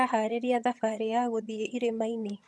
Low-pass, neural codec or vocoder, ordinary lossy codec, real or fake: none; vocoder, 22.05 kHz, 80 mel bands, HiFi-GAN; none; fake